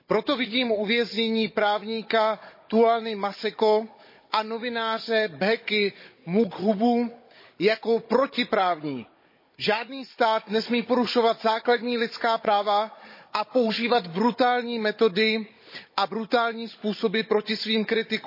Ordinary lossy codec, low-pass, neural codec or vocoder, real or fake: MP3, 24 kbps; 5.4 kHz; codec, 16 kHz, 16 kbps, FunCodec, trained on Chinese and English, 50 frames a second; fake